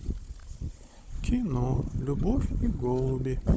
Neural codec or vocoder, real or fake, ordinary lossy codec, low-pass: codec, 16 kHz, 16 kbps, FunCodec, trained on Chinese and English, 50 frames a second; fake; none; none